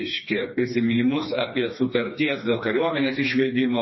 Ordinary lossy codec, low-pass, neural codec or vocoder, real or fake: MP3, 24 kbps; 7.2 kHz; codec, 16 kHz, 2 kbps, FreqCodec, smaller model; fake